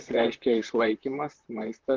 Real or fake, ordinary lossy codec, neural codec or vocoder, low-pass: fake; Opus, 24 kbps; vocoder, 44.1 kHz, 128 mel bands, Pupu-Vocoder; 7.2 kHz